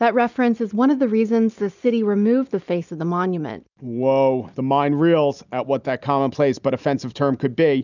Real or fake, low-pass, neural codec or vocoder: real; 7.2 kHz; none